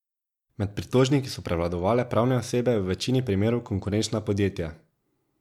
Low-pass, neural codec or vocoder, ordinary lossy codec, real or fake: 19.8 kHz; none; MP3, 96 kbps; real